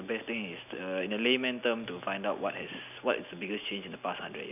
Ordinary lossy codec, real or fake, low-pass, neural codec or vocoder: none; real; 3.6 kHz; none